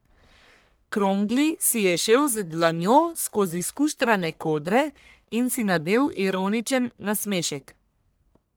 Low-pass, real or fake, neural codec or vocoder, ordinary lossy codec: none; fake; codec, 44.1 kHz, 1.7 kbps, Pupu-Codec; none